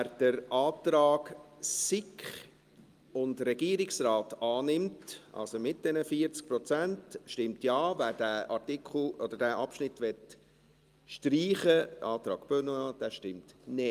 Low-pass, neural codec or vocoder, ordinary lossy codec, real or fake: 14.4 kHz; none; Opus, 32 kbps; real